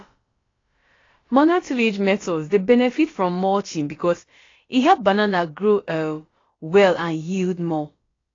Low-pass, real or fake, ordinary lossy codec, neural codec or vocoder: 7.2 kHz; fake; AAC, 32 kbps; codec, 16 kHz, about 1 kbps, DyCAST, with the encoder's durations